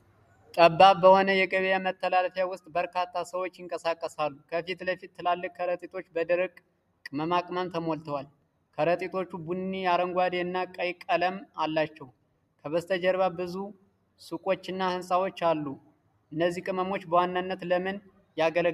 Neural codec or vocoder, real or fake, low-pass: none; real; 14.4 kHz